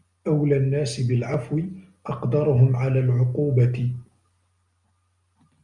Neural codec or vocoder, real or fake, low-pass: none; real; 10.8 kHz